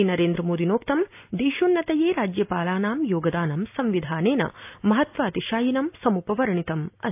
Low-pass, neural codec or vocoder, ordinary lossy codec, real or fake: 3.6 kHz; none; AAC, 32 kbps; real